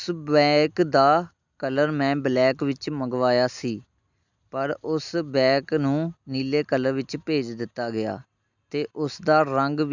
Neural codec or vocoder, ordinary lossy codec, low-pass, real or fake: none; none; 7.2 kHz; real